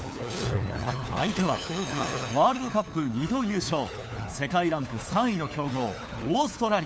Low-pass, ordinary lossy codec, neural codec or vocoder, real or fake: none; none; codec, 16 kHz, 4 kbps, FunCodec, trained on LibriTTS, 50 frames a second; fake